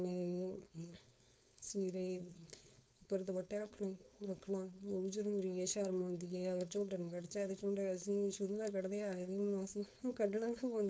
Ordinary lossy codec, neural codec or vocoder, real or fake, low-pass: none; codec, 16 kHz, 4.8 kbps, FACodec; fake; none